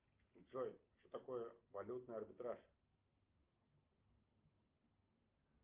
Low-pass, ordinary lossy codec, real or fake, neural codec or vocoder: 3.6 kHz; Opus, 24 kbps; real; none